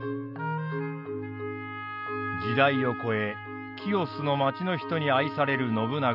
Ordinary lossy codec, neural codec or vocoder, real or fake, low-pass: none; none; real; 5.4 kHz